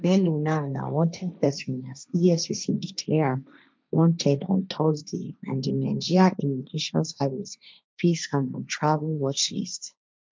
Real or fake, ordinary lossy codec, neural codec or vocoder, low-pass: fake; none; codec, 16 kHz, 1.1 kbps, Voila-Tokenizer; none